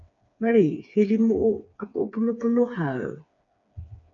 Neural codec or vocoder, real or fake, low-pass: codec, 16 kHz, 4 kbps, FreqCodec, smaller model; fake; 7.2 kHz